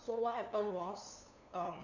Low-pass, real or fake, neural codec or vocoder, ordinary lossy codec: 7.2 kHz; fake; codec, 16 kHz, 2 kbps, FunCodec, trained on LibriTTS, 25 frames a second; none